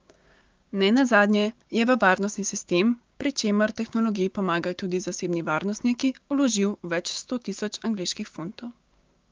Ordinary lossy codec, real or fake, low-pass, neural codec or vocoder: Opus, 24 kbps; fake; 7.2 kHz; codec, 16 kHz, 6 kbps, DAC